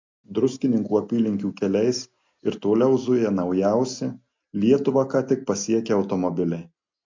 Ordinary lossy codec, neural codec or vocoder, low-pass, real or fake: MP3, 64 kbps; none; 7.2 kHz; real